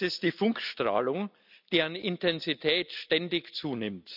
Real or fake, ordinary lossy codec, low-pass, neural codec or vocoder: real; none; 5.4 kHz; none